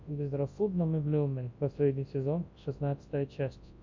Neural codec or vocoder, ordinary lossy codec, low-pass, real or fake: codec, 24 kHz, 0.9 kbps, WavTokenizer, large speech release; MP3, 48 kbps; 7.2 kHz; fake